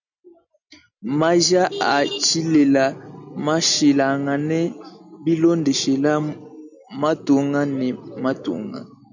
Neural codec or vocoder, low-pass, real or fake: none; 7.2 kHz; real